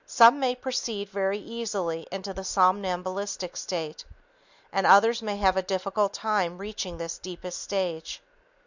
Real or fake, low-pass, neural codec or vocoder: real; 7.2 kHz; none